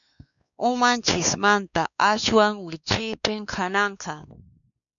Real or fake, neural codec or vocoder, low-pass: fake; codec, 16 kHz, 2 kbps, X-Codec, WavLM features, trained on Multilingual LibriSpeech; 7.2 kHz